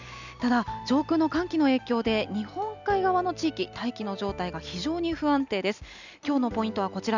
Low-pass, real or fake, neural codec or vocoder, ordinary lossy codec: 7.2 kHz; real; none; none